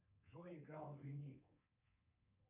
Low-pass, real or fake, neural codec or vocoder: 3.6 kHz; fake; codec, 24 kHz, 3.1 kbps, DualCodec